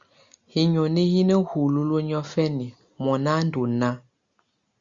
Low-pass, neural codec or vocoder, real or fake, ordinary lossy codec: 7.2 kHz; none; real; Opus, 64 kbps